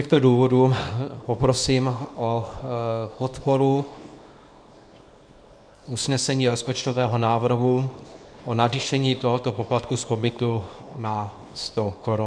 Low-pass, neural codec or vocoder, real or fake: 9.9 kHz; codec, 24 kHz, 0.9 kbps, WavTokenizer, small release; fake